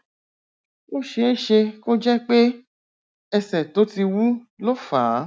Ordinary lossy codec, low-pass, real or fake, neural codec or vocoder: none; none; real; none